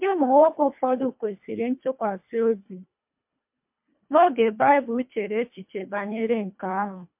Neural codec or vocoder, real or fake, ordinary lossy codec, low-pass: codec, 24 kHz, 1.5 kbps, HILCodec; fake; MP3, 32 kbps; 3.6 kHz